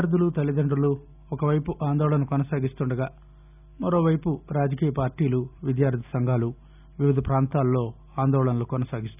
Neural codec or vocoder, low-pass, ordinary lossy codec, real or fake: none; 3.6 kHz; none; real